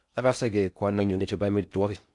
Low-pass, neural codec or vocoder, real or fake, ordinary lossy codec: 10.8 kHz; codec, 16 kHz in and 24 kHz out, 0.6 kbps, FocalCodec, streaming, 4096 codes; fake; none